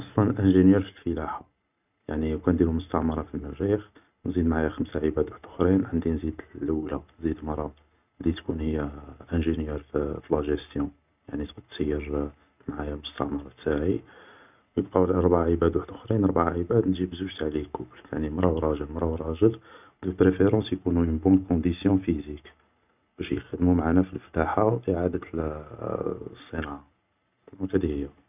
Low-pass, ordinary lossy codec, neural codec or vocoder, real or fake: 3.6 kHz; none; none; real